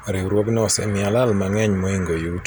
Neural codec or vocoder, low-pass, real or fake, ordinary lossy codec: none; none; real; none